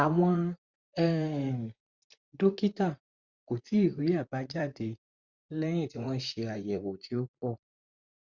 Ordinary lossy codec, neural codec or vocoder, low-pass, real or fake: Opus, 64 kbps; vocoder, 44.1 kHz, 128 mel bands, Pupu-Vocoder; 7.2 kHz; fake